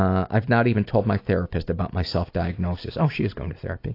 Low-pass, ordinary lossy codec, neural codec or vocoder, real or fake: 5.4 kHz; AAC, 32 kbps; none; real